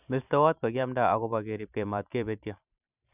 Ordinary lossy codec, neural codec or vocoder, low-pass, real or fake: none; vocoder, 44.1 kHz, 128 mel bands every 512 samples, BigVGAN v2; 3.6 kHz; fake